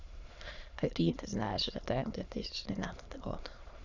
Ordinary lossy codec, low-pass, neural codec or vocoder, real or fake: Opus, 64 kbps; 7.2 kHz; autoencoder, 22.05 kHz, a latent of 192 numbers a frame, VITS, trained on many speakers; fake